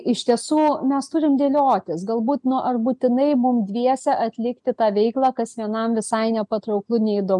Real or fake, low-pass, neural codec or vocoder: real; 10.8 kHz; none